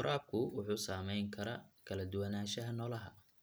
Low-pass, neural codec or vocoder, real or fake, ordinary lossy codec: none; none; real; none